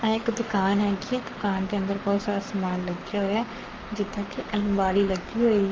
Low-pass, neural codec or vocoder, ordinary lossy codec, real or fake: 7.2 kHz; codec, 44.1 kHz, 7.8 kbps, Pupu-Codec; Opus, 32 kbps; fake